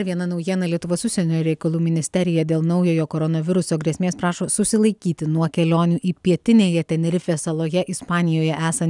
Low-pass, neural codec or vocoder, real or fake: 10.8 kHz; none; real